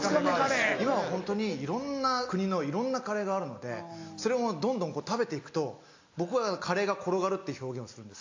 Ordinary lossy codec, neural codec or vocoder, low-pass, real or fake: AAC, 48 kbps; none; 7.2 kHz; real